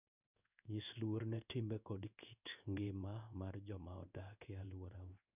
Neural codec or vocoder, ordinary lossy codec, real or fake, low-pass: codec, 16 kHz in and 24 kHz out, 1 kbps, XY-Tokenizer; none; fake; 3.6 kHz